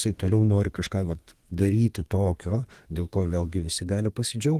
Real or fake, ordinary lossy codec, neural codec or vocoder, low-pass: fake; Opus, 24 kbps; codec, 32 kHz, 1.9 kbps, SNAC; 14.4 kHz